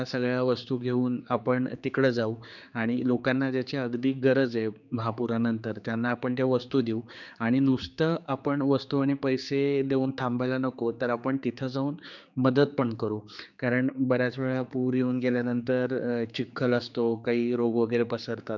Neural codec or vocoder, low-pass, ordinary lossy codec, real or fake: codec, 16 kHz, 4 kbps, X-Codec, HuBERT features, trained on general audio; 7.2 kHz; none; fake